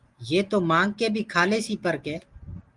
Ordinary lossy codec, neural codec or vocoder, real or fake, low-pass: Opus, 24 kbps; none; real; 10.8 kHz